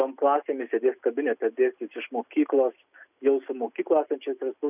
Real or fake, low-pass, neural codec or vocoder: real; 3.6 kHz; none